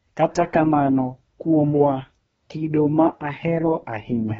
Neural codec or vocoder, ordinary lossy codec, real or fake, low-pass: codec, 24 kHz, 1 kbps, SNAC; AAC, 24 kbps; fake; 10.8 kHz